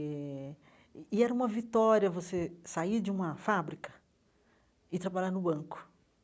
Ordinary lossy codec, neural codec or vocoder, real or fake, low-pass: none; none; real; none